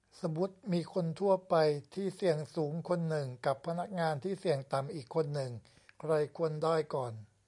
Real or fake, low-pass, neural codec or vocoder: real; 10.8 kHz; none